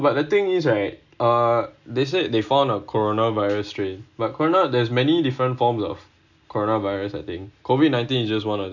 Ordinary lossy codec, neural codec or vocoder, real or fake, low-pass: none; none; real; 7.2 kHz